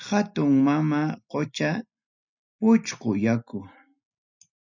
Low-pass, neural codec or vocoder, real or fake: 7.2 kHz; none; real